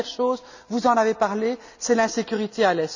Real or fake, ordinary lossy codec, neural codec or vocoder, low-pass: real; none; none; 7.2 kHz